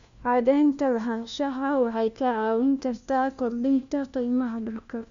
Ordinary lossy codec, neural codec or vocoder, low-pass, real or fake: Opus, 64 kbps; codec, 16 kHz, 1 kbps, FunCodec, trained on LibriTTS, 50 frames a second; 7.2 kHz; fake